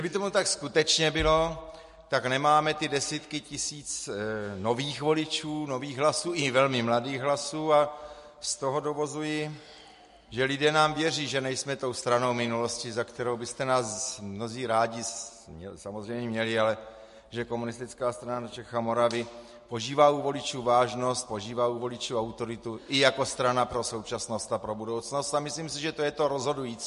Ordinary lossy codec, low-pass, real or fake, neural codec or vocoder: MP3, 48 kbps; 14.4 kHz; real; none